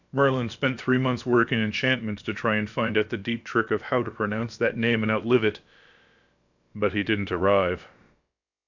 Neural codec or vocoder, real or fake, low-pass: codec, 16 kHz, about 1 kbps, DyCAST, with the encoder's durations; fake; 7.2 kHz